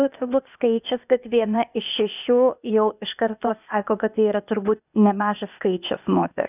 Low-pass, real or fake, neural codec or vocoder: 3.6 kHz; fake; codec, 16 kHz, 0.8 kbps, ZipCodec